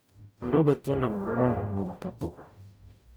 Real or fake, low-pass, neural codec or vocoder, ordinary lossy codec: fake; none; codec, 44.1 kHz, 0.9 kbps, DAC; none